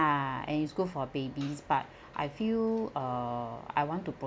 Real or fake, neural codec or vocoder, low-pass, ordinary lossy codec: real; none; none; none